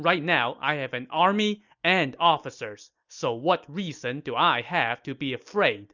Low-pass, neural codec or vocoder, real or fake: 7.2 kHz; none; real